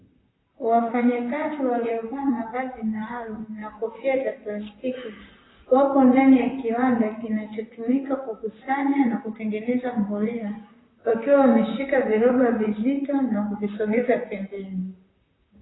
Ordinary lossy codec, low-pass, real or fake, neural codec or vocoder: AAC, 16 kbps; 7.2 kHz; fake; vocoder, 44.1 kHz, 128 mel bands, Pupu-Vocoder